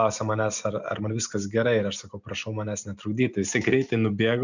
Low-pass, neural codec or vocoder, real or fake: 7.2 kHz; none; real